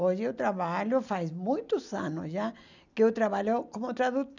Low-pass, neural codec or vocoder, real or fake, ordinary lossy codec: 7.2 kHz; none; real; none